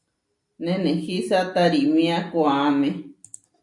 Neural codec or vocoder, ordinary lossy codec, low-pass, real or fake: none; MP3, 64 kbps; 10.8 kHz; real